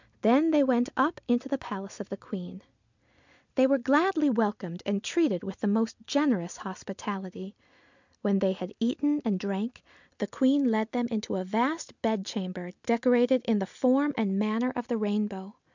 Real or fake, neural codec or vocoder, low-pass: real; none; 7.2 kHz